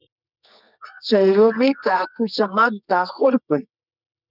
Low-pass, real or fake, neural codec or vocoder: 5.4 kHz; fake; codec, 24 kHz, 0.9 kbps, WavTokenizer, medium music audio release